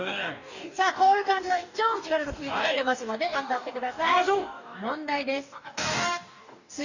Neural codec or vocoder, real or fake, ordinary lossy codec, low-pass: codec, 44.1 kHz, 2.6 kbps, DAC; fake; none; 7.2 kHz